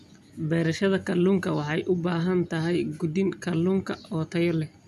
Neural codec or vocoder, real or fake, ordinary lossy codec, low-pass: none; real; none; 14.4 kHz